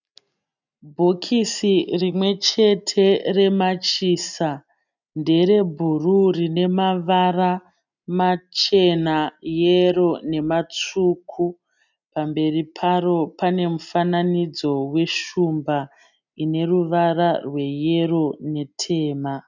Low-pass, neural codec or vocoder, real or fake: 7.2 kHz; none; real